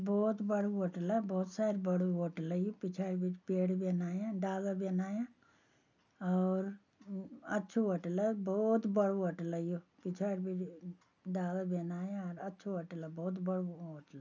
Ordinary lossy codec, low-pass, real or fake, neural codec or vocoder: none; 7.2 kHz; real; none